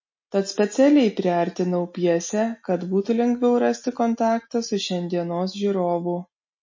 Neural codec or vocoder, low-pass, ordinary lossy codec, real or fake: none; 7.2 kHz; MP3, 32 kbps; real